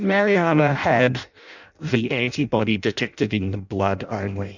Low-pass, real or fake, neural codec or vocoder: 7.2 kHz; fake; codec, 16 kHz in and 24 kHz out, 0.6 kbps, FireRedTTS-2 codec